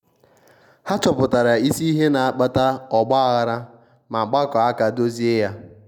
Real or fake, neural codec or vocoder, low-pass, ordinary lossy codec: real; none; 19.8 kHz; none